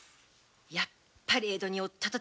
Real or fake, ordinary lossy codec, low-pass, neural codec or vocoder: real; none; none; none